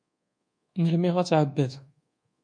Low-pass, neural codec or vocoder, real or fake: 9.9 kHz; codec, 24 kHz, 1.2 kbps, DualCodec; fake